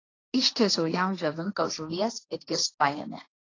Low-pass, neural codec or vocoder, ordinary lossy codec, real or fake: 7.2 kHz; codec, 16 kHz, 1.1 kbps, Voila-Tokenizer; AAC, 32 kbps; fake